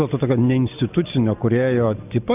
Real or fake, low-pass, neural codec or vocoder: real; 3.6 kHz; none